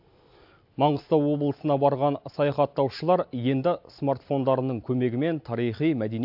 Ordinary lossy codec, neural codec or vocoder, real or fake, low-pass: AAC, 48 kbps; none; real; 5.4 kHz